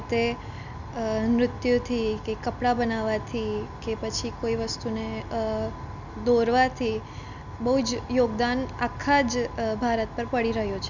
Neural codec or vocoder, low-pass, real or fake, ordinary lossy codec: none; 7.2 kHz; real; none